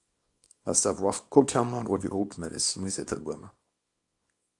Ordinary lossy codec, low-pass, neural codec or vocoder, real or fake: AAC, 64 kbps; 10.8 kHz; codec, 24 kHz, 0.9 kbps, WavTokenizer, small release; fake